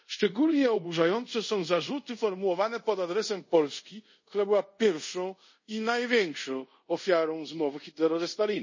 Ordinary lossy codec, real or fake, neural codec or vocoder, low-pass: MP3, 32 kbps; fake; codec, 24 kHz, 0.5 kbps, DualCodec; 7.2 kHz